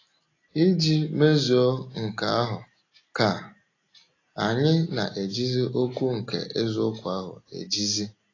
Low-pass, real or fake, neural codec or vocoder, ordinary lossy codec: 7.2 kHz; real; none; AAC, 32 kbps